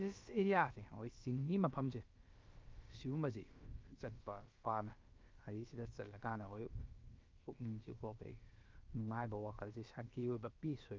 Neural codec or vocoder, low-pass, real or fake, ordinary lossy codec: codec, 16 kHz, about 1 kbps, DyCAST, with the encoder's durations; 7.2 kHz; fake; Opus, 32 kbps